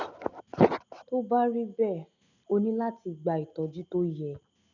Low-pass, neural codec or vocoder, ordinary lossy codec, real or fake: 7.2 kHz; none; none; real